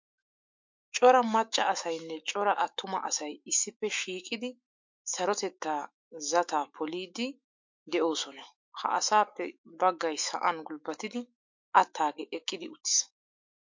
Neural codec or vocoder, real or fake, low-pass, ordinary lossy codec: autoencoder, 48 kHz, 128 numbers a frame, DAC-VAE, trained on Japanese speech; fake; 7.2 kHz; MP3, 48 kbps